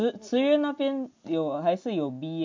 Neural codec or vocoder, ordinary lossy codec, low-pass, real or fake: none; MP3, 48 kbps; 7.2 kHz; real